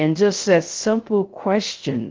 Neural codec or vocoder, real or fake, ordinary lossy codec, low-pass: codec, 16 kHz, 0.3 kbps, FocalCodec; fake; Opus, 16 kbps; 7.2 kHz